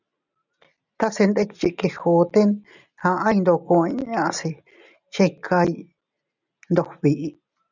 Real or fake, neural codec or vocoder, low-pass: real; none; 7.2 kHz